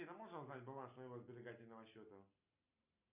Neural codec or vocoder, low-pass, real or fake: none; 3.6 kHz; real